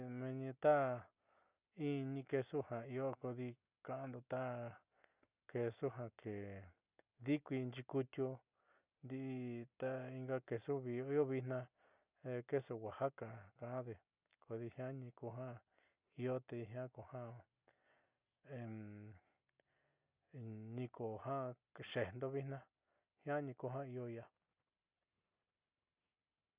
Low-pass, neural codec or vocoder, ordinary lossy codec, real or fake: 3.6 kHz; none; Opus, 64 kbps; real